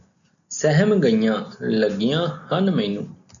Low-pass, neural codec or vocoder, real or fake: 7.2 kHz; none; real